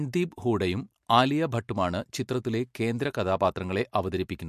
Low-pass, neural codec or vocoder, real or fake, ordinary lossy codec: 14.4 kHz; none; real; MP3, 64 kbps